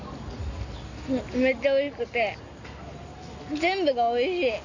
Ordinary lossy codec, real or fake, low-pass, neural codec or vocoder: none; real; 7.2 kHz; none